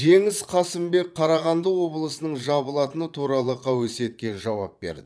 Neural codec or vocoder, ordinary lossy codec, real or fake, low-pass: vocoder, 22.05 kHz, 80 mel bands, Vocos; none; fake; none